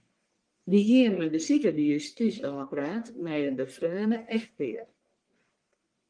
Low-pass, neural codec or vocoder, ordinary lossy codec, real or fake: 9.9 kHz; codec, 44.1 kHz, 1.7 kbps, Pupu-Codec; Opus, 24 kbps; fake